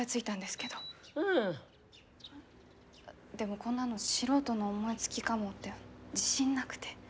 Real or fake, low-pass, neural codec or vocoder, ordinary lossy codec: real; none; none; none